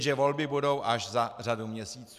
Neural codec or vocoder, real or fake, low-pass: none; real; 14.4 kHz